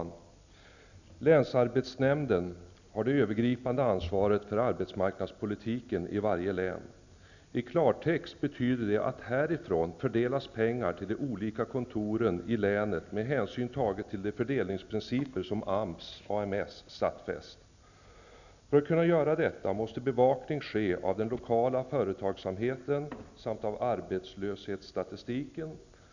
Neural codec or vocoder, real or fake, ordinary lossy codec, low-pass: none; real; none; 7.2 kHz